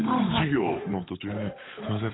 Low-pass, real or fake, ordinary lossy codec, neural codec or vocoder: 7.2 kHz; fake; AAC, 16 kbps; codec, 16 kHz, 8 kbps, FunCodec, trained on Chinese and English, 25 frames a second